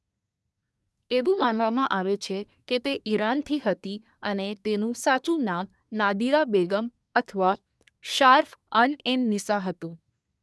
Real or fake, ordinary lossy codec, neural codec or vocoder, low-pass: fake; none; codec, 24 kHz, 1 kbps, SNAC; none